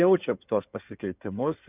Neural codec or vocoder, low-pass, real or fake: codec, 16 kHz in and 24 kHz out, 2.2 kbps, FireRedTTS-2 codec; 3.6 kHz; fake